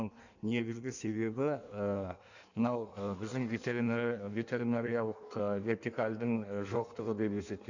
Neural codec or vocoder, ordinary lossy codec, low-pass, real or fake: codec, 16 kHz in and 24 kHz out, 1.1 kbps, FireRedTTS-2 codec; none; 7.2 kHz; fake